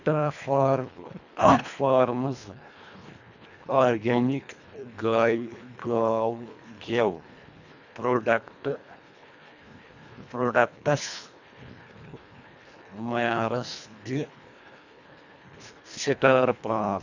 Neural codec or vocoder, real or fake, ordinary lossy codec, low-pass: codec, 24 kHz, 1.5 kbps, HILCodec; fake; none; 7.2 kHz